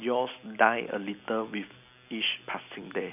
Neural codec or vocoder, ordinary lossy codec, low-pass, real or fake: none; none; 3.6 kHz; real